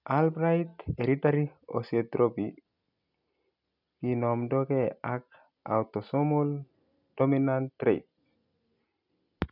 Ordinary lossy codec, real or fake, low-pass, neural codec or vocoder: none; real; 5.4 kHz; none